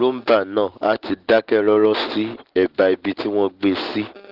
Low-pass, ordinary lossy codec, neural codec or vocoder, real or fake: 5.4 kHz; Opus, 16 kbps; none; real